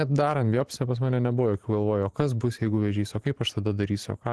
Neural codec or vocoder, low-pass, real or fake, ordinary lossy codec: vocoder, 44.1 kHz, 128 mel bands every 512 samples, BigVGAN v2; 10.8 kHz; fake; Opus, 16 kbps